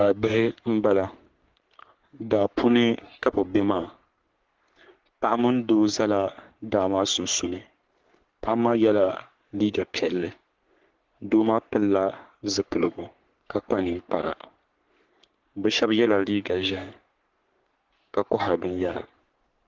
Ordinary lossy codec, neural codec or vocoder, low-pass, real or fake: Opus, 16 kbps; codec, 44.1 kHz, 3.4 kbps, Pupu-Codec; 7.2 kHz; fake